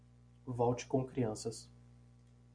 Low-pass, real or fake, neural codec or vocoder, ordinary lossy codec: 9.9 kHz; real; none; MP3, 96 kbps